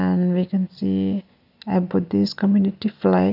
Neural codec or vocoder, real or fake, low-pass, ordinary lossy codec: none; real; 5.4 kHz; none